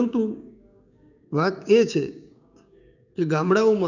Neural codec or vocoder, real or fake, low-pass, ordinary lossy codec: codec, 16 kHz, 6 kbps, DAC; fake; 7.2 kHz; none